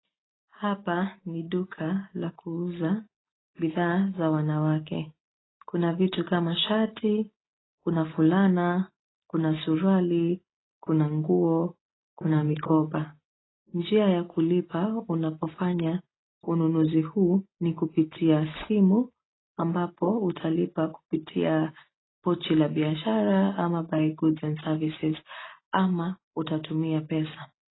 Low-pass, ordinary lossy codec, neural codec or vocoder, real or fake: 7.2 kHz; AAC, 16 kbps; none; real